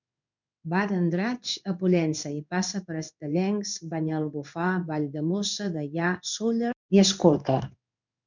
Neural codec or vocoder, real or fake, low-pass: codec, 16 kHz in and 24 kHz out, 1 kbps, XY-Tokenizer; fake; 7.2 kHz